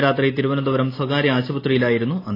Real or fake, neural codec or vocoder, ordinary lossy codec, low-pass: real; none; AAC, 24 kbps; 5.4 kHz